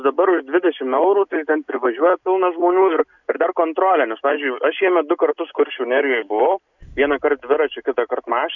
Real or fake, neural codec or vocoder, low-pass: real; none; 7.2 kHz